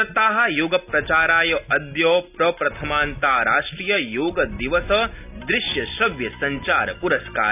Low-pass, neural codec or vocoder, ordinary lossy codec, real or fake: 3.6 kHz; none; none; real